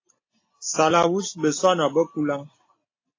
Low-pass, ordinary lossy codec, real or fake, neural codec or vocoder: 7.2 kHz; AAC, 32 kbps; real; none